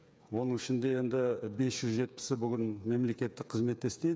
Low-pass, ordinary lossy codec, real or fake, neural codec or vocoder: none; none; fake; codec, 16 kHz, 8 kbps, FreqCodec, smaller model